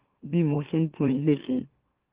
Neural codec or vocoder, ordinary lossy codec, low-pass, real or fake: autoencoder, 44.1 kHz, a latent of 192 numbers a frame, MeloTTS; Opus, 16 kbps; 3.6 kHz; fake